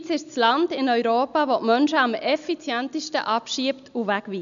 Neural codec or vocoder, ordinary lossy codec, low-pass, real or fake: none; none; 7.2 kHz; real